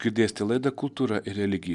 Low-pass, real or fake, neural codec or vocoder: 10.8 kHz; real; none